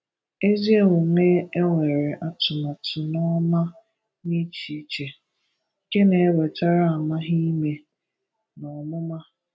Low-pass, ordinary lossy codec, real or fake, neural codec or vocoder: none; none; real; none